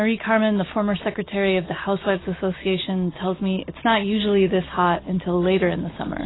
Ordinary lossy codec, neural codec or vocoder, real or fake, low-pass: AAC, 16 kbps; none; real; 7.2 kHz